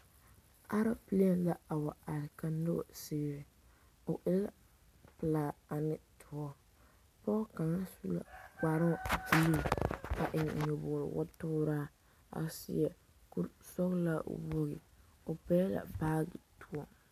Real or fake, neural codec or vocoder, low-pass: real; none; 14.4 kHz